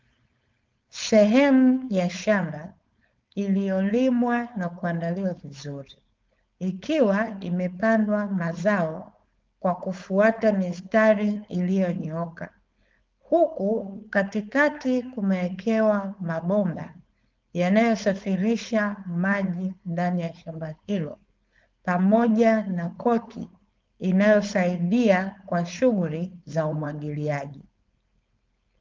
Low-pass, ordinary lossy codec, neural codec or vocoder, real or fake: 7.2 kHz; Opus, 24 kbps; codec, 16 kHz, 4.8 kbps, FACodec; fake